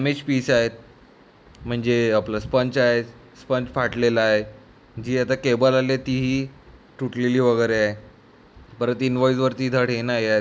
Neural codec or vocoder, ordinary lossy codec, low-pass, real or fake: none; none; none; real